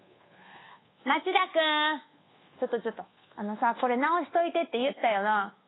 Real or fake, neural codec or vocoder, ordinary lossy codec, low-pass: fake; codec, 24 kHz, 1.2 kbps, DualCodec; AAC, 16 kbps; 7.2 kHz